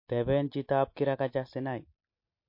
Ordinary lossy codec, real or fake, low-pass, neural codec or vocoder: MP3, 32 kbps; real; 5.4 kHz; none